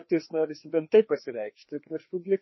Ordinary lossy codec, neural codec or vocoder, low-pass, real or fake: MP3, 24 kbps; codec, 16 kHz, 2 kbps, FreqCodec, larger model; 7.2 kHz; fake